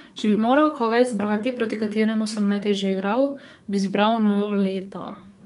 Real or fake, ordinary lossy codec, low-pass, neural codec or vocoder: fake; none; 10.8 kHz; codec, 24 kHz, 1 kbps, SNAC